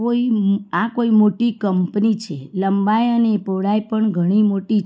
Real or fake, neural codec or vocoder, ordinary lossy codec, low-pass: real; none; none; none